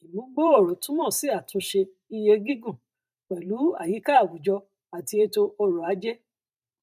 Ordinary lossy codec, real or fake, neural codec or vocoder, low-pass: none; fake; vocoder, 44.1 kHz, 128 mel bands every 512 samples, BigVGAN v2; 14.4 kHz